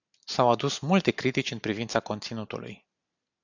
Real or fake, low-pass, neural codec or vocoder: real; 7.2 kHz; none